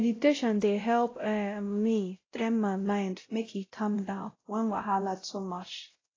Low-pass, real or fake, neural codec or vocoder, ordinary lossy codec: 7.2 kHz; fake; codec, 16 kHz, 0.5 kbps, X-Codec, WavLM features, trained on Multilingual LibriSpeech; AAC, 32 kbps